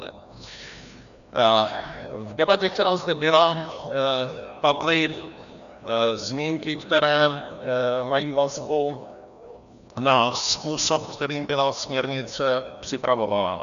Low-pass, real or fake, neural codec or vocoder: 7.2 kHz; fake; codec, 16 kHz, 1 kbps, FreqCodec, larger model